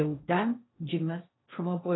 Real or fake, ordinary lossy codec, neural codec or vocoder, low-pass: fake; AAC, 16 kbps; codec, 16 kHz in and 24 kHz out, 0.6 kbps, FocalCodec, streaming, 4096 codes; 7.2 kHz